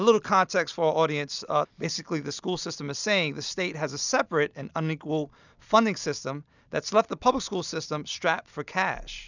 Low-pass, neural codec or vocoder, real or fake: 7.2 kHz; none; real